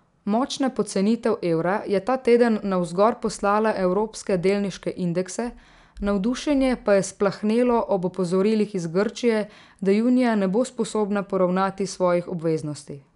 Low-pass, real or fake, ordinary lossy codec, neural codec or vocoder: 10.8 kHz; real; none; none